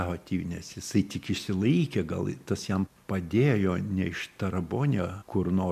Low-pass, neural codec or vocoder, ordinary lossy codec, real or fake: 14.4 kHz; none; MP3, 96 kbps; real